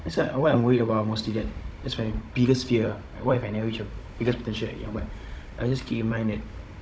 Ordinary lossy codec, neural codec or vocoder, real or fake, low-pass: none; codec, 16 kHz, 16 kbps, FunCodec, trained on Chinese and English, 50 frames a second; fake; none